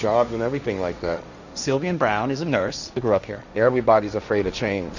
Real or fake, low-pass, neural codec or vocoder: fake; 7.2 kHz; codec, 16 kHz, 1.1 kbps, Voila-Tokenizer